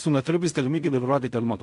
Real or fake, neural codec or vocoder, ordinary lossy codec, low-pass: fake; codec, 16 kHz in and 24 kHz out, 0.4 kbps, LongCat-Audio-Codec, fine tuned four codebook decoder; AAC, 64 kbps; 10.8 kHz